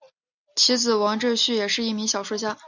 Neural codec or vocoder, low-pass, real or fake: none; 7.2 kHz; real